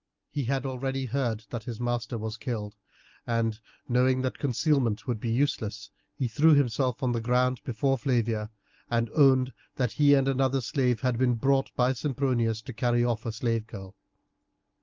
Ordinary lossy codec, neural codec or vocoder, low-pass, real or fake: Opus, 24 kbps; none; 7.2 kHz; real